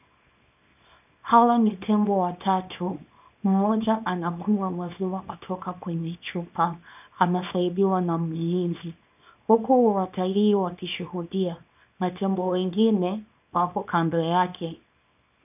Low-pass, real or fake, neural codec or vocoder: 3.6 kHz; fake; codec, 24 kHz, 0.9 kbps, WavTokenizer, small release